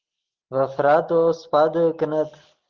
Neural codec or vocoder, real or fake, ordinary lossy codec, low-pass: none; real; Opus, 16 kbps; 7.2 kHz